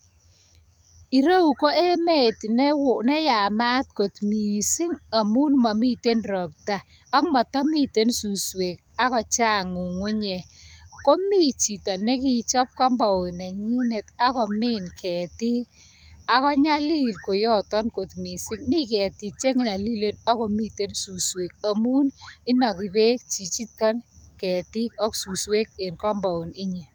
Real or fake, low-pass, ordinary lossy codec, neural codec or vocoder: fake; none; none; codec, 44.1 kHz, 7.8 kbps, DAC